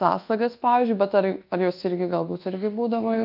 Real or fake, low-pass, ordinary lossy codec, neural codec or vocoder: fake; 5.4 kHz; Opus, 24 kbps; codec, 24 kHz, 1.2 kbps, DualCodec